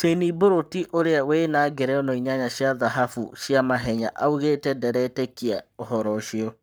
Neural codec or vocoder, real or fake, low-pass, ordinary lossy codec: codec, 44.1 kHz, 7.8 kbps, Pupu-Codec; fake; none; none